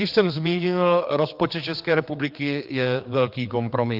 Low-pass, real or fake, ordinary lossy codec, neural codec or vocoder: 5.4 kHz; fake; Opus, 32 kbps; codec, 16 kHz, 4 kbps, X-Codec, HuBERT features, trained on general audio